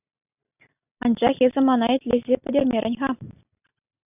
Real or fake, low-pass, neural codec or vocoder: real; 3.6 kHz; none